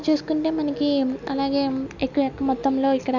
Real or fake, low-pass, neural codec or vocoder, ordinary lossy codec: real; 7.2 kHz; none; none